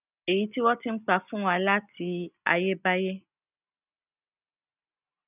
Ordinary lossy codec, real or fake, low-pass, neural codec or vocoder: none; real; 3.6 kHz; none